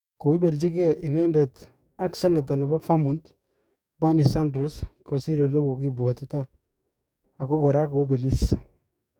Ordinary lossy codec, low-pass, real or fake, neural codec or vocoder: none; 19.8 kHz; fake; codec, 44.1 kHz, 2.6 kbps, DAC